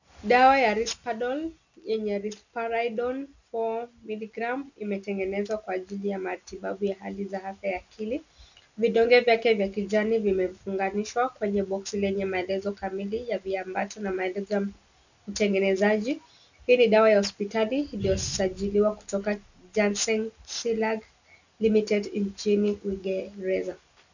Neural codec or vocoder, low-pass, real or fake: none; 7.2 kHz; real